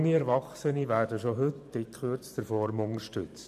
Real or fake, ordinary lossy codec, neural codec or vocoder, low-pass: fake; none; vocoder, 44.1 kHz, 128 mel bands every 512 samples, BigVGAN v2; 14.4 kHz